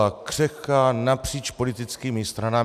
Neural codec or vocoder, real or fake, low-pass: none; real; 14.4 kHz